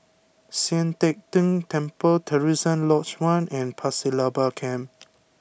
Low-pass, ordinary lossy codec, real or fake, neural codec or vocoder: none; none; real; none